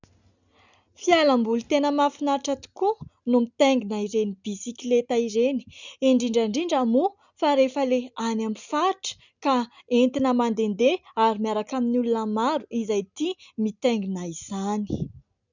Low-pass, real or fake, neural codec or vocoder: 7.2 kHz; real; none